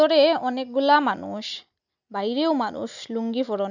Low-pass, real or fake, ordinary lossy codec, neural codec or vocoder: 7.2 kHz; real; none; none